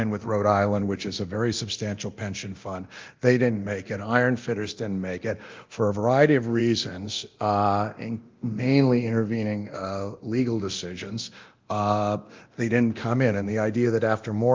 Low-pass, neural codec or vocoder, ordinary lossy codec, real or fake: 7.2 kHz; codec, 24 kHz, 0.9 kbps, DualCodec; Opus, 32 kbps; fake